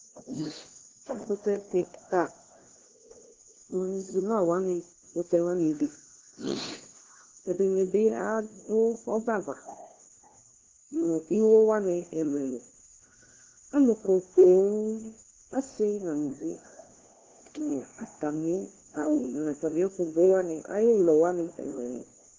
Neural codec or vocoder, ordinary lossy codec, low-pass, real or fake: codec, 16 kHz, 1 kbps, FunCodec, trained on LibriTTS, 50 frames a second; Opus, 16 kbps; 7.2 kHz; fake